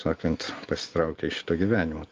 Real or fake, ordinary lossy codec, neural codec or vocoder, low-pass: real; Opus, 32 kbps; none; 7.2 kHz